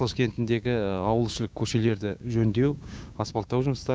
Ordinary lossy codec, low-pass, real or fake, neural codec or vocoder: none; none; fake; codec, 16 kHz, 6 kbps, DAC